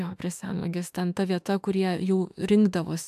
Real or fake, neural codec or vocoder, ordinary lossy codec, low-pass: fake; autoencoder, 48 kHz, 32 numbers a frame, DAC-VAE, trained on Japanese speech; AAC, 96 kbps; 14.4 kHz